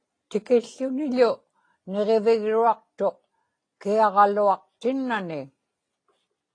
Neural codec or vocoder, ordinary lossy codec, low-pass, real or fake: none; AAC, 32 kbps; 9.9 kHz; real